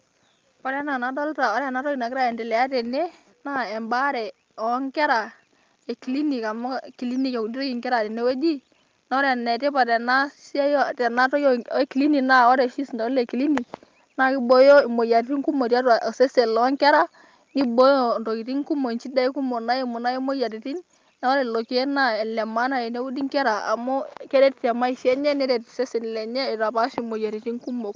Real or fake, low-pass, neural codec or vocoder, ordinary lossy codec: real; 7.2 kHz; none; Opus, 24 kbps